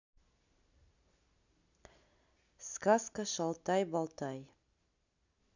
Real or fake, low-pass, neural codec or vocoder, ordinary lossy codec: real; 7.2 kHz; none; none